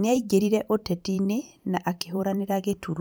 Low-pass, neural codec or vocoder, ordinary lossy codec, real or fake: none; vocoder, 44.1 kHz, 128 mel bands every 512 samples, BigVGAN v2; none; fake